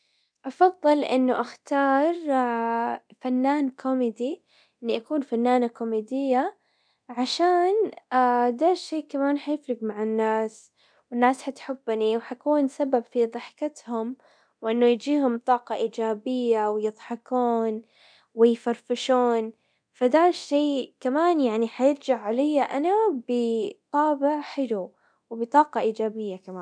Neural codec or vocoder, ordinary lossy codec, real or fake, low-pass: codec, 24 kHz, 0.9 kbps, DualCodec; none; fake; 9.9 kHz